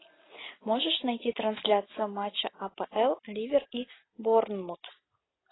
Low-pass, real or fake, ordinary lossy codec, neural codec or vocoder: 7.2 kHz; real; AAC, 16 kbps; none